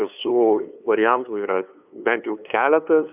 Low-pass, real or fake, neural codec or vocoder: 3.6 kHz; fake; codec, 16 kHz, 2 kbps, FunCodec, trained on LibriTTS, 25 frames a second